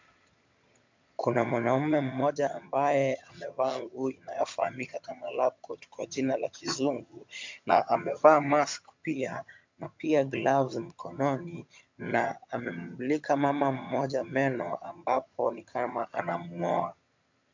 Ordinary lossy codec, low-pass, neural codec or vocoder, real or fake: MP3, 64 kbps; 7.2 kHz; vocoder, 22.05 kHz, 80 mel bands, HiFi-GAN; fake